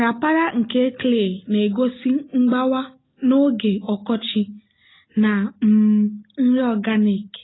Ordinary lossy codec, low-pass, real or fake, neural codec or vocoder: AAC, 16 kbps; 7.2 kHz; real; none